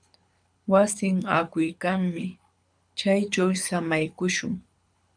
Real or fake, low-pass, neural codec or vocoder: fake; 9.9 kHz; codec, 24 kHz, 6 kbps, HILCodec